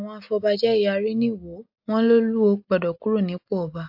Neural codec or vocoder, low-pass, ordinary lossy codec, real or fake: none; 5.4 kHz; AAC, 48 kbps; real